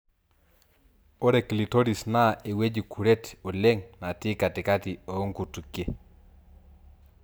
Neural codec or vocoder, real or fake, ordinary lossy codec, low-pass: none; real; none; none